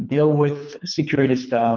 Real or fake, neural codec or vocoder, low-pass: fake; codec, 24 kHz, 3 kbps, HILCodec; 7.2 kHz